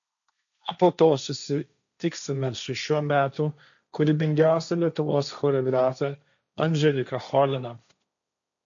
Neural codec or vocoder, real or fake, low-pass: codec, 16 kHz, 1.1 kbps, Voila-Tokenizer; fake; 7.2 kHz